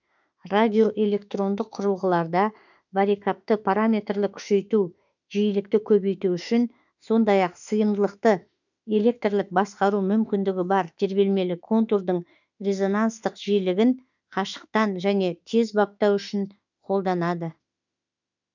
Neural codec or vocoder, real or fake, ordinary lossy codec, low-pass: autoencoder, 48 kHz, 32 numbers a frame, DAC-VAE, trained on Japanese speech; fake; none; 7.2 kHz